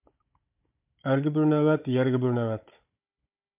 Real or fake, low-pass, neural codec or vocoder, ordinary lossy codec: fake; 3.6 kHz; codec, 16 kHz, 16 kbps, FunCodec, trained on Chinese and English, 50 frames a second; MP3, 32 kbps